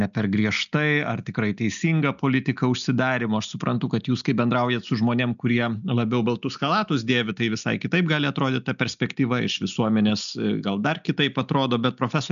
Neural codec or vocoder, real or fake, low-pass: none; real; 7.2 kHz